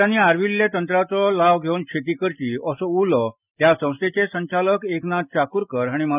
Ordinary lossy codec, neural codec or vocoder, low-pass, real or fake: none; none; 3.6 kHz; real